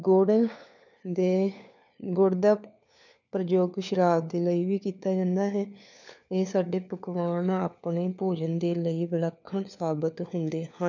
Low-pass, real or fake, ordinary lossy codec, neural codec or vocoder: 7.2 kHz; fake; none; codec, 16 kHz, 4 kbps, FunCodec, trained on LibriTTS, 50 frames a second